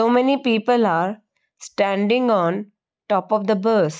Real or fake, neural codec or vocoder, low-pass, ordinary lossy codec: real; none; none; none